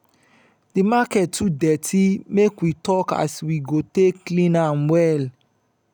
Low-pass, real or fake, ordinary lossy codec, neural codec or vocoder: none; real; none; none